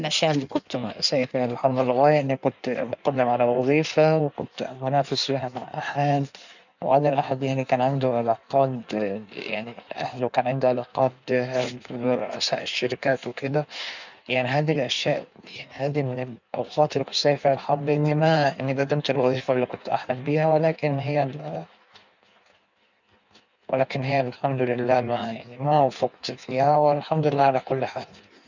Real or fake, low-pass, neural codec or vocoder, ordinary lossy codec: fake; 7.2 kHz; codec, 16 kHz in and 24 kHz out, 1.1 kbps, FireRedTTS-2 codec; none